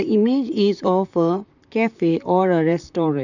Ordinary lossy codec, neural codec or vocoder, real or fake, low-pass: none; vocoder, 44.1 kHz, 128 mel bands, Pupu-Vocoder; fake; 7.2 kHz